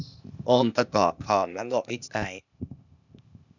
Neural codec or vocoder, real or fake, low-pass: codec, 16 kHz, 0.8 kbps, ZipCodec; fake; 7.2 kHz